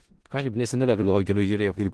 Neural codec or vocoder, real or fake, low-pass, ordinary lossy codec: codec, 16 kHz in and 24 kHz out, 0.4 kbps, LongCat-Audio-Codec, four codebook decoder; fake; 10.8 kHz; Opus, 16 kbps